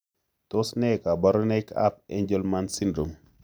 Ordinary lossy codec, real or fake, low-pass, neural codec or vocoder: none; real; none; none